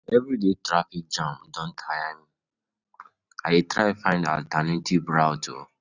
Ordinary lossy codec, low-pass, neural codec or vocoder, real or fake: none; 7.2 kHz; none; real